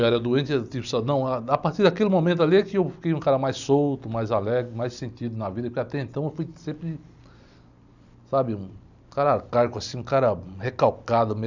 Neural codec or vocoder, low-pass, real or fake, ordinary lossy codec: none; 7.2 kHz; real; none